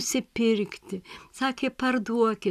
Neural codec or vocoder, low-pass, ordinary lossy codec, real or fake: none; 14.4 kHz; AAC, 96 kbps; real